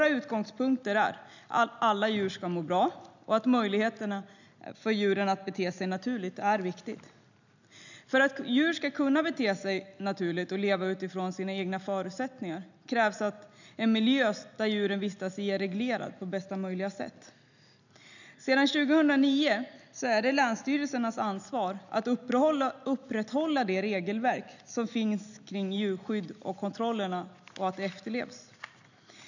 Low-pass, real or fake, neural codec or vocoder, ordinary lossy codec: 7.2 kHz; real; none; none